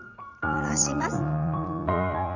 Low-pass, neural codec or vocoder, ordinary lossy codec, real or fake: 7.2 kHz; vocoder, 44.1 kHz, 80 mel bands, Vocos; none; fake